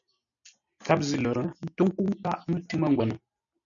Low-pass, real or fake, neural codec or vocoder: 7.2 kHz; real; none